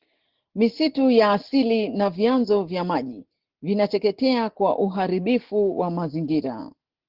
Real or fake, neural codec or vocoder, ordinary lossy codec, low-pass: real; none; Opus, 16 kbps; 5.4 kHz